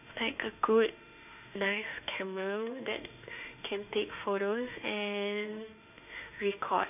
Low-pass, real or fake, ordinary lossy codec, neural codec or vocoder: 3.6 kHz; fake; none; autoencoder, 48 kHz, 32 numbers a frame, DAC-VAE, trained on Japanese speech